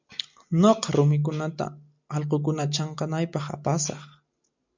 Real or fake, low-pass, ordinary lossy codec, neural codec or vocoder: real; 7.2 kHz; MP3, 64 kbps; none